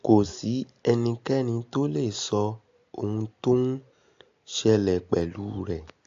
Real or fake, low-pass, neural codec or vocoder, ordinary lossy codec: real; 7.2 kHz; none; AAC, 48 kbps